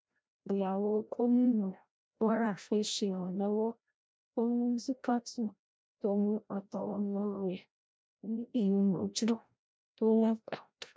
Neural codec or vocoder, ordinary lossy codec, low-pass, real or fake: codec, 16 kHz, 0.5 kbps, FreqCodec, larger model; none; none; fake